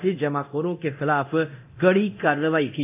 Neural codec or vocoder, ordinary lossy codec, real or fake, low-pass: codec, 24 kHz, 0.9 kbps, DualCodec; AAC, 32 kbps; fake; 3.6 kHz